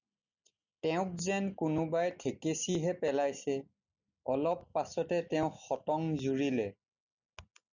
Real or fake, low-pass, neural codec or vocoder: real; 7.2 kHz; none